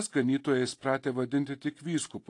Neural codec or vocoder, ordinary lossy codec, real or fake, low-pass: none; AAC, 48 kbps; real; 10.8 kHz